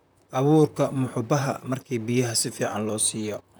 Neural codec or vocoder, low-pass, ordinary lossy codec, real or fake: vocoder, 44.1 kHz, 128 mel bands, Pupu-Vocoder; none; none; fake